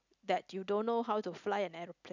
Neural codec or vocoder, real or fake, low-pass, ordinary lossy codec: none; real; 7.2 kHz; none